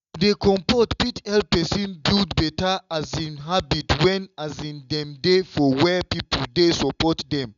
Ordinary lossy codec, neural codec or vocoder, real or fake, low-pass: none; none; real; 7.2 kHz